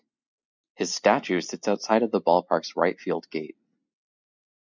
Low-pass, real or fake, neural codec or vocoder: 7.2 kHz; real; none